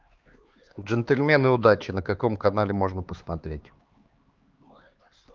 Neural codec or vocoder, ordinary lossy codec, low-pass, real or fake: codec, 16 kHz, 4 kbps, X-Codec, HuBERT features, trained on LibriSpeech; Opus, 32 kbps; 7.2 kHz; fake